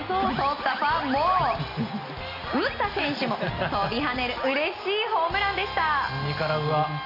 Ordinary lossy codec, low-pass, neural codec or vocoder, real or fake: none; 5.4 kHz; none; real